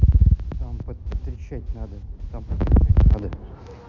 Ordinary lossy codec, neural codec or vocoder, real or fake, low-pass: none; none; real; 7.2 kHz